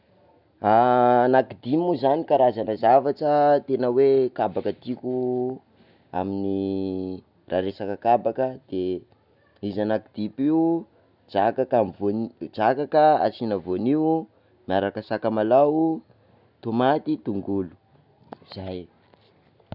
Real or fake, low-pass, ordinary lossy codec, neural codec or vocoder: real; 5.4 kHz; none; none